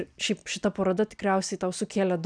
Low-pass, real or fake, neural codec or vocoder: 9.9 kHz; real; none